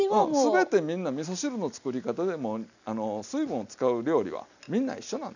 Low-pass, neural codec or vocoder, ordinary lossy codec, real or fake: 7.2 kHz; none; none; real